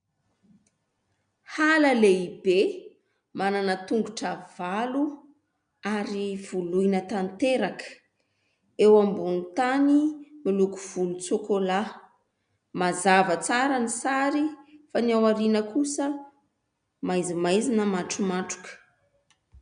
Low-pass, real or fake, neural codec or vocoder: 9.9 kHz; real; none